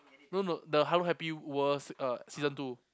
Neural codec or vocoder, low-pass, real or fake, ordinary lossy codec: none; none; real; none